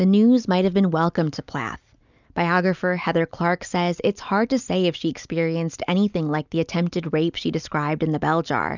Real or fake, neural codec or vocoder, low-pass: real; none; 7.2 kHz